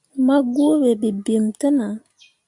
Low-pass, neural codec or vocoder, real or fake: 10.8 kHz; none; real